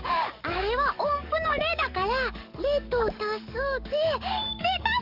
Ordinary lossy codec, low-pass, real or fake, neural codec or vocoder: AAC, 48 kbps; 5.4 kHz; fake; codec, 44.1 kHz, 7.8 kbps, DAC